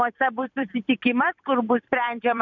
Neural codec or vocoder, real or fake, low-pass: vocoder, 44.1 kHz, 128 mel bands every 256 samples, BigVGAN v2; fake; 7.2 kHz